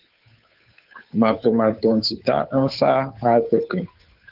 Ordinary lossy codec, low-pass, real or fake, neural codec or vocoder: Opus, 24 kbps; 5.4 kHz; fake; codec, 16 kHz, 2 kbps, FunCodec, trained on Chinese and English, 25 frames a second